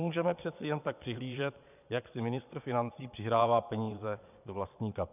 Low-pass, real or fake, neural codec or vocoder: 3.6 kHz; fake; vocoder, 22.05 kHz, 80 mel bands, Vocos